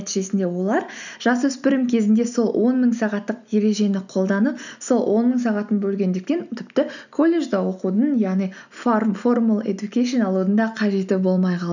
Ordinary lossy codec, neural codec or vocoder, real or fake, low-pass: none; none; real; 7.2 kHz